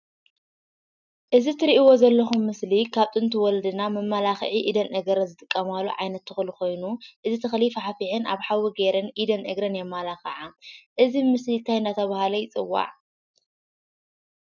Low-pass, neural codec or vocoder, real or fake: 7.2 kHz; none; real